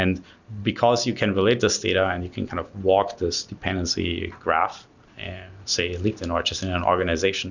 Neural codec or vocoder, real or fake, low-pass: none; real; 7.2 kHz